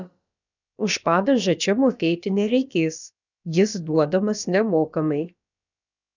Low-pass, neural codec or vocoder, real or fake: 7.2 kHz; codec, 16 kHz, about 1 kbps, DyCAST, with the encoder's durations; fake